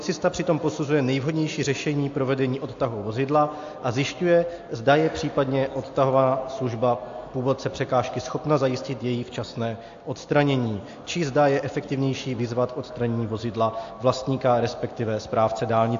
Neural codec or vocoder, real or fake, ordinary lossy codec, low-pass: none; real; AAC, 48 kbps; 7.2 kHz